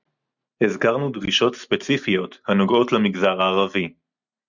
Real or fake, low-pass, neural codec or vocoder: real; 7.2 kHz; none